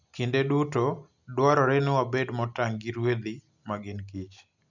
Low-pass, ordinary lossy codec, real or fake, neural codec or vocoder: 7.2 kHz; none; real; none